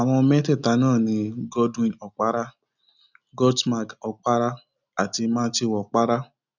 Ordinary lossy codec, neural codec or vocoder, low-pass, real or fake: none; none; 7.2 kHz; real